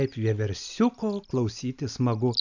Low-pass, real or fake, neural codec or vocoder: 7.2 kHz; real; none